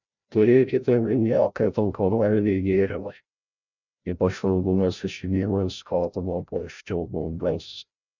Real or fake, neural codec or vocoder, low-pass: fake; codec, 16 kHz, 0.5 kbps, FreqCodec, larger model; 7.2 kHz